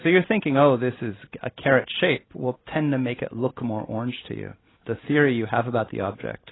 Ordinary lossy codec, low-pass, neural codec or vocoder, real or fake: AAC, 16 kbps; 7.2 kHz; none; real